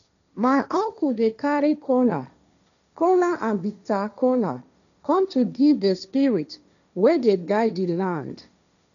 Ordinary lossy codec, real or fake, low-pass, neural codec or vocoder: none; fake; 7.2 kHz; codec, 16 kHz, 1.1 kbps, Voila-Tokenizer